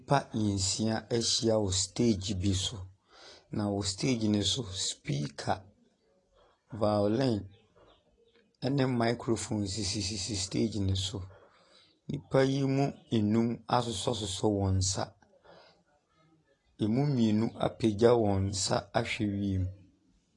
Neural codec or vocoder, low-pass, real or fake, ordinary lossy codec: none; 10.8 kHz; real; AAC, 32 kbps